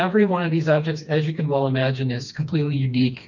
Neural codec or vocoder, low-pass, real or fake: codec, 16 kHz, 2 kbps, FreqCodec, smaller model; 7.2 kHz; fake